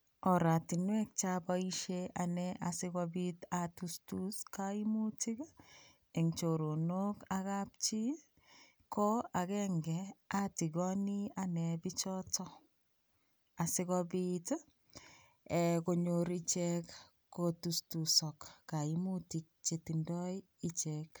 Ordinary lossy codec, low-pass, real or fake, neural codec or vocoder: none; none; real; none